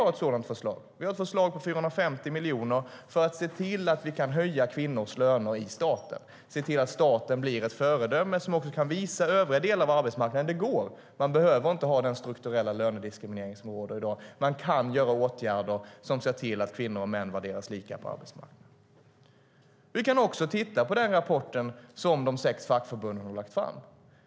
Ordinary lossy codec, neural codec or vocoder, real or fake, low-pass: none; none; real; none